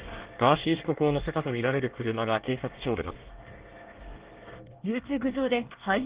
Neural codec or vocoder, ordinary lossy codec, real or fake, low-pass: codec, 24 kHz, 1 kbps, SNAC; Opus, 16 kbps; fake; 3.6 kHz